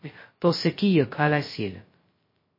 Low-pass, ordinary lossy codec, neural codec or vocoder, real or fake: 5.4 kHz; MP3, 24 kbps; codec, 16 kHz, 0.2 kbps, FocalCodec; fake